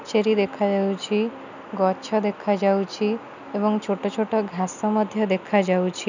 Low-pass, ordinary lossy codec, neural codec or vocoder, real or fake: 7.2 kHz; none; none; real